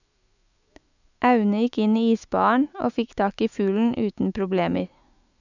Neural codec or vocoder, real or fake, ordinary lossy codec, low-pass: autoencoder, 48 kHz, 128 numbers a frame, DAC-VAE, trained on Japanese speech; fake; none; 7.2 kHz